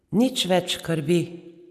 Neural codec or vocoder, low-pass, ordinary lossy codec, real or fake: none; 14.4 kHz; none; real